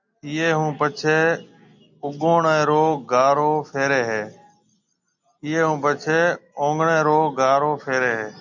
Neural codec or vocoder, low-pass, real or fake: none; 7.2 kHz; real